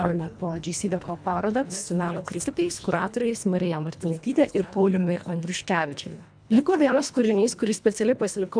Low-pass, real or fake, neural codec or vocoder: 9.9 kHz; fake; codec, 24 kHz, 1.5 kbps, HILCodec